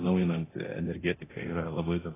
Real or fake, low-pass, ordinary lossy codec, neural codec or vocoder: fake; 3.6 kHz; AAC, 16 kbps; codec, 24 kHz, 0.9 kbps, DualCodec